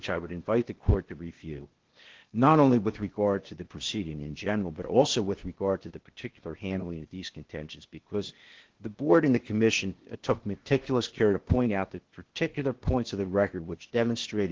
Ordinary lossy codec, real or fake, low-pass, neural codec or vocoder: Opus, 16 kbps; fake; 7.2 kHz; codec, 16 kHz, 0.8 kbps, ZipCodec